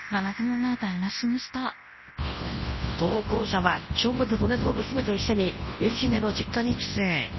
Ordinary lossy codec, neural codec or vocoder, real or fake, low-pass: MP3, 24 kbps; codec, 24 kHz, 0.9 kbps, WavTokenizer, large speech release; fake; 7.2 kHz